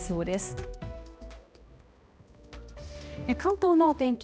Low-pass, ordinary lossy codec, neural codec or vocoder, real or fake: none; none; codec, 16 kHz, 1 kbps, X-Codec, HuBERT features, trained on balanced general audio; fake